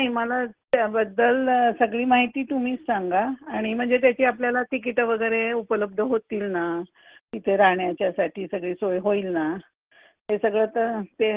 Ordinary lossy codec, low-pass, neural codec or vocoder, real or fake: Opus, 16 kbps; 3.6 kHz; none; real